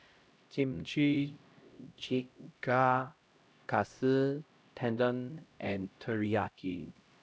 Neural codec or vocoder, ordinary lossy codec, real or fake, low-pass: codec, 16 kHz, 0.5 kbps, X-Codec, HuBERT features, trained on LibriSpeech; none; fake; none